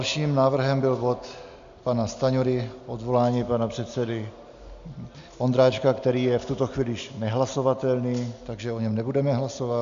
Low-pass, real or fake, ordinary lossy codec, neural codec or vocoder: 7.2 kHz; real; MP3, 64 kbps; none